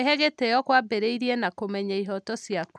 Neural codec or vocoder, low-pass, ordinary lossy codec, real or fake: none; none; none; real